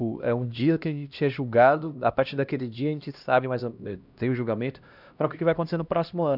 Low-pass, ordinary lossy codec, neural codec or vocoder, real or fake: 5.4 kHz; none; codec, 16 kHz, 1 kbps, X-Codec, HuBERT features, trained on LibriSpeech; fake